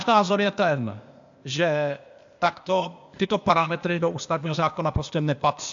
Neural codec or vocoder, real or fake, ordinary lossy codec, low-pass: codec, 16 kHz, 0.8 kbps, ZipCodec; fake; AAC, 64 kbps; 7.2 kHz